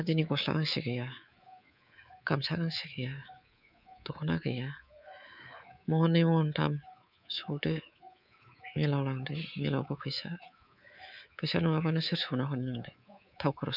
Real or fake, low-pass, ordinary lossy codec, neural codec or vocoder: fake; 5.4 kHz; none; codec, 24 kHz, 3.1 kbps, DualCodec